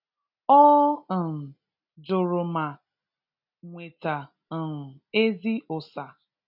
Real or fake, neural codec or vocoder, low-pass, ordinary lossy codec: real; none; 5.4 kHz; none